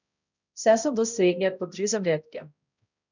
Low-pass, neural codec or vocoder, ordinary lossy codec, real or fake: 7.2 kHz; codec, 16 kHz, 0.5 kbps, X-Codec, HuBERT features, trained on balanced general audio; none; fake